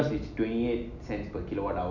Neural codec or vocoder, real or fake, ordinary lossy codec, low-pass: none; real; none; 7.2 kHz